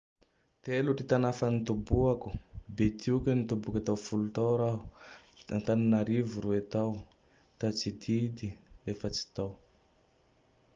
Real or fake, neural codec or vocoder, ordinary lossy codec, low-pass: real; none; Opus, 24 kbps; 7.2 kHz